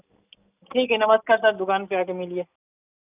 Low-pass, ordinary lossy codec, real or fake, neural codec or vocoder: 3.6 kHz; none; real; none